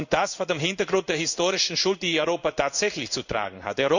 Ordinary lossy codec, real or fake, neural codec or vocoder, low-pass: none; fake; codec, 16 kHz in and 24 kHz out, 1 kbps, XY-Tokenizer; 7.2 kHz